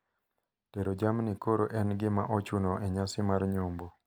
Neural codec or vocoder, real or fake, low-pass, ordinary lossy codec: none; real; none; none